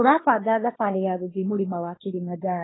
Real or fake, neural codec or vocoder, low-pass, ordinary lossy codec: fake; codec, 24 kHz, 1 kbps, SNAC; 7.2 kHz; AAC, 16 kbps